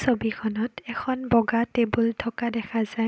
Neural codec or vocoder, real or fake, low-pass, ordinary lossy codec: none; real; none; none